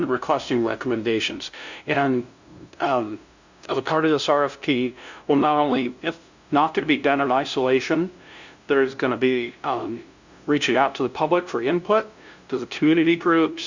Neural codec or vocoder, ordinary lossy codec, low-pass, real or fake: codec, 16 kHz, 0.5 kbps, FunCodec, trained on LibriTTS, 25 frames a second; Opus, 64 kbps; 7.2 kHz; fake